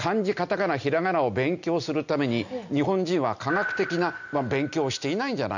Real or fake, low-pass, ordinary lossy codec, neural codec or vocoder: real; 7.2 kHz; none; none